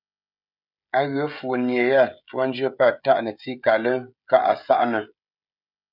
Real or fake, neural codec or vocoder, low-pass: fake; codec, 16 kHz, 16 kbps, FreqCodec, smaller model; 5.4 kHz